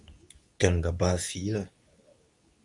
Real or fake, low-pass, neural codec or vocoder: fake; 10.8 kHz; codec, 24 kHz, 0.9 kbps, WavTokenizer, medium speech release version 2